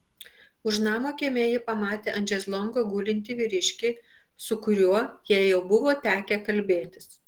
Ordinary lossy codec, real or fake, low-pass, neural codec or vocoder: Opus, 16 kbps; real; 19.8 kHz; none